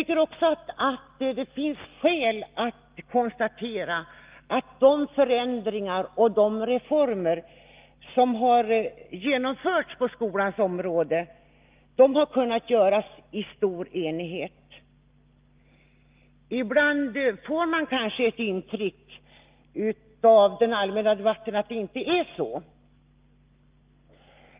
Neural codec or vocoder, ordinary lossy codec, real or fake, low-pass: none; Opus, 32 kbps; real; 3.6 kHz